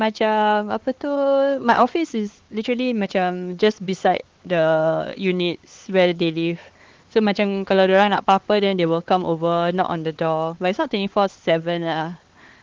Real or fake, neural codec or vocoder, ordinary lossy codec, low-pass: fake; codec, 16 kHz, 4 kbps, X-Codec, HuBERT features, trained on LibriSpeech; Opus, 16 kbps; 7.2 kHz